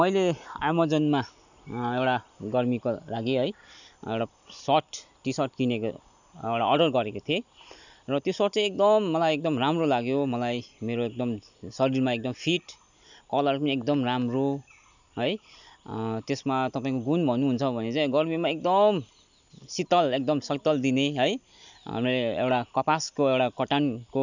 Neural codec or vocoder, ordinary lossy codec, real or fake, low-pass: none; none; real; 7.2 kHz